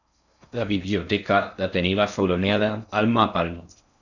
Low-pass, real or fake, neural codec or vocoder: 7.2 kHz; fake; codec, 16 kHz in and 24 kHz out, 0.6 kbps, FocalCodec, streaming, 4096 codes